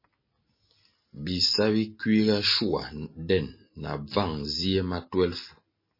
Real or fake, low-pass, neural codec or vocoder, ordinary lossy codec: real; 5.4 kHz; none; MP3, 24 kbps